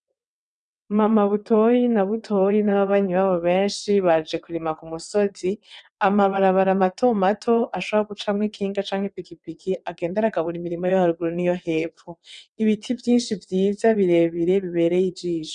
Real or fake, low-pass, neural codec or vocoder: fake; 9.9 kHz; vocoder, 22.05 kHz, 80 mel bands, WaveNeXt